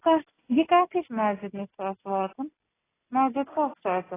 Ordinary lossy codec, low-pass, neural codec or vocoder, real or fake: AAC, 16 kbps; 3.6 kHz; none; real